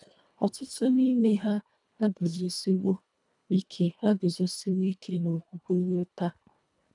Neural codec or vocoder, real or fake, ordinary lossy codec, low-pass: codec, 24 kHz, 1.5 kbps, HILCodec; fake; none; 10.8 kHz